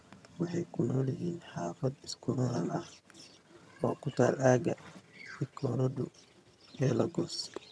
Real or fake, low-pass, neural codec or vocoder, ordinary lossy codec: fake; none; vocoder, 22.05 kHz, 80 mel bands, HiFi-GAN; none